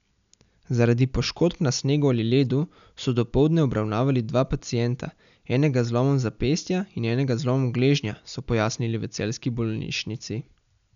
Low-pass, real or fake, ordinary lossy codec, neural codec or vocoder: 7.2 kHz; real; none; none